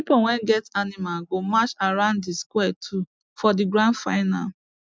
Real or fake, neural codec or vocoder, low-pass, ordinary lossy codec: real; none; none; none